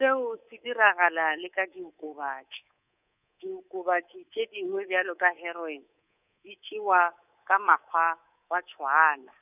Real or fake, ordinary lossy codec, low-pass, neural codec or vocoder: fake; none; 3.6 kHz; codec, 24 kHz, 3.1 kbps, DualCodec